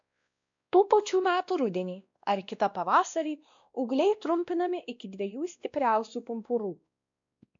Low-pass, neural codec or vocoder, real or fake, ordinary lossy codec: 7.2 kHz; codec, 16 kHz, 1 kbps, X-Codec, WavLM features, trained on Multilingual LibriSpeech; fake; MP3, 64 kbps